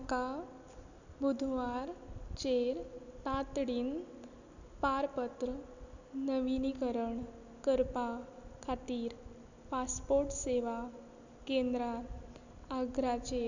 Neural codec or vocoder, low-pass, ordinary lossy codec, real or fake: none; 7.2 kHz; none; real